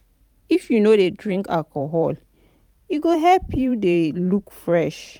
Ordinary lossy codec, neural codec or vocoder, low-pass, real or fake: none; vocoder, 44.1 kHz, 128 mel bands every 512 samples, BigVGAN v2; 19.8 kHz; fake